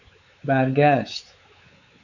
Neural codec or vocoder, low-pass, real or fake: codec, 16 kHz, 4 kbps, X-Codec, WavLM features, trained on Multilingual LibriSpeech; 7.2 kHz; fake